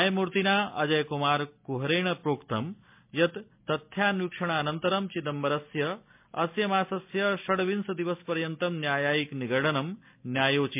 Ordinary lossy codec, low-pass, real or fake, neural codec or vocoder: MP3, 32 kbps; 3.6 kHz; real; none